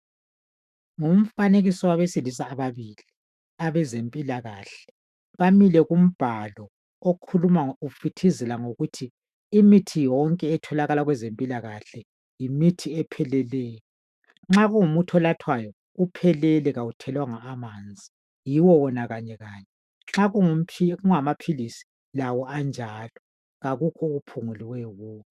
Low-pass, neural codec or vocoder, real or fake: 14.4 kHz; autoencoder, 48 kHz, 128 numbers a frame, DAC-VAE, trained on Japanese speech; fake